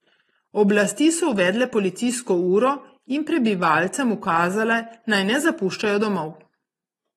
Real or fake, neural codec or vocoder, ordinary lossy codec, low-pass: real; none; AAC, 32 kbps; 19.8 kHz